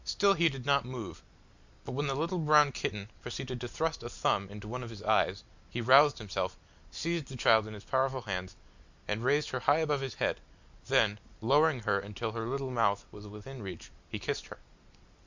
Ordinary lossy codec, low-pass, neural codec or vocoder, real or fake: Opus, 64 kbps; 7.2 kHz; none; real